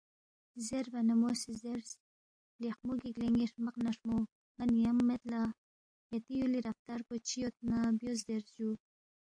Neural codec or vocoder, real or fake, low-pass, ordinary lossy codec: none; real; 9.9 kHz; AAC, 48 kbps